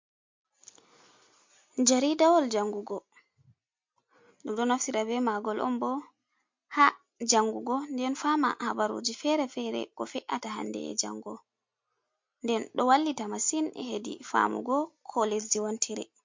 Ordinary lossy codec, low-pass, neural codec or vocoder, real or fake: MP3, 48 kbps; 7.2 kHz; none; real